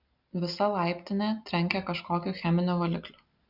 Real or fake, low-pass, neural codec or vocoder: real; 5.4 kHz; none